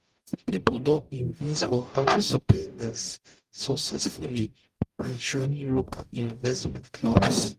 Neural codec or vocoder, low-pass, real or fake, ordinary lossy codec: codec, 44.1 kHz, 0.9 kbps, DAC; 14.4 kHz; fake; Opus, 32 kbps